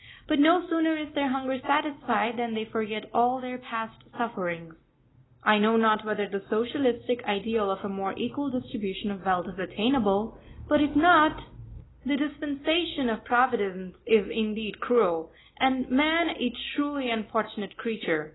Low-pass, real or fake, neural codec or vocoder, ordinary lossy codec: 7.2 kHz; real; none; AAC, 16 kbps